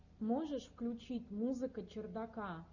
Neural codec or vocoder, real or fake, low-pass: none; real; 7.2 kHz